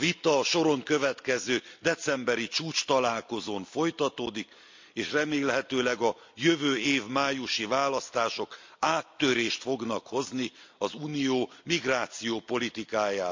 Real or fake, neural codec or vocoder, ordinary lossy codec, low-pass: real; none; MP3, 64 kbps; 7.2 kHz